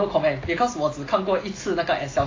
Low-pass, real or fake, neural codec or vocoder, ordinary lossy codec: 7.2 kHz; real; none; AAC, 32 kbps